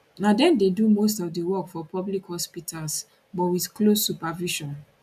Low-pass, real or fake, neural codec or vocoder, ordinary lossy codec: 14.4 kHz; real; none; none